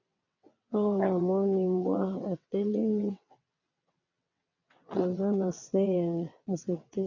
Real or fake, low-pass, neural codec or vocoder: fake; 7.2 kHz; vocoder, 22.05 kHz, 80 mel bands, WaveNeXt